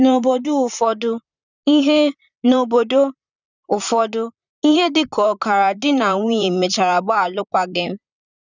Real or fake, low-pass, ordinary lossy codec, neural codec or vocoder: fake; 7.2 kHz; none; vocoder, 44.1 kHz, 128 mel bands, Pupu-Vocoder